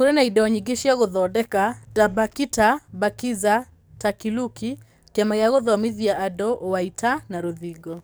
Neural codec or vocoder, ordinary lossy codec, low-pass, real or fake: codec, 44.1 kHz, 7.8 kbps, DAC; none; none; fake